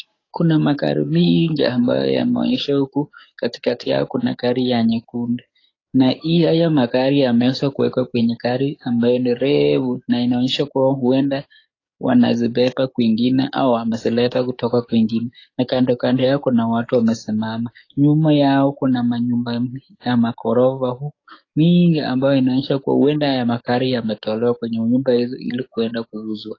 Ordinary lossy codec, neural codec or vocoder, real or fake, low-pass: AAC, 32 kbps; codec, 44.1 kHz, 7.8 kbps, DAC; fake; 7.2 kHz